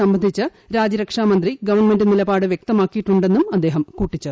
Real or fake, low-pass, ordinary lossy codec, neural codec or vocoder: real; none; none; none